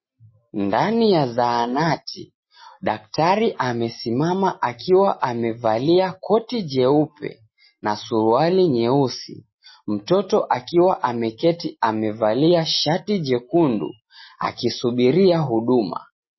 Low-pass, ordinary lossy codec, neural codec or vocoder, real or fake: 7.2 kHz; MP3, 24 kbps; none; real